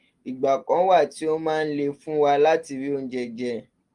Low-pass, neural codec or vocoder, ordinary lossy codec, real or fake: 10.8 kHz; none; Opus, 24 kbps; real